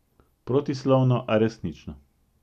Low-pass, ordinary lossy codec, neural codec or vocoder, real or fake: 14.4 kHz; none; none; real